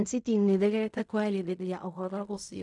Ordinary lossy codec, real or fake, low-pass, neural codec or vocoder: none; fake; 10.8 kHz; codec, 16 kHz in and 24 kHz out, 0.4 kbps, LongCat-Audio-Codec, fine tuned four codebook decoder